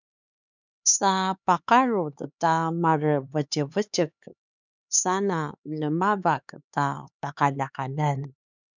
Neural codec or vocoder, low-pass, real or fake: codec, 16 kHz, 4 kbps, X-Codec, HuBERT features, trained on LibriSpeech; 7.2 kHz; fake